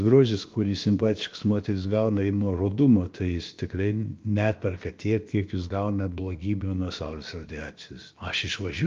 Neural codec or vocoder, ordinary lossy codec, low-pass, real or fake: codec, 16 kHz, about 1 kbps, DyCAST, with the encoder's durations; Opus, 32 kbps; 7.2 kHz; fake